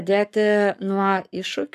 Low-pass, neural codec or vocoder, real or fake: 14.4 kHz; codec, 44.1 kHz, 7.8 kbps, Pupu-Codec; fake